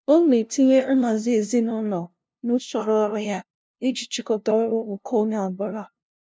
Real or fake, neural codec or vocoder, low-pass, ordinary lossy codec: fake; codec, 16 kHz, 0.5 kbps, FunCodec, trained on LibriTTS, 25 frames a second; none; none